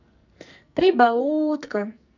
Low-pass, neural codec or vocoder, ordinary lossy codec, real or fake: 7.2 kHz; codec, 44.1 kHz, 2.6 kbps, SNAC; none; fake